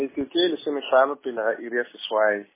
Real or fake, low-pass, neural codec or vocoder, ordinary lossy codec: real; 3.6 kHz; none; MP3, 16 kbps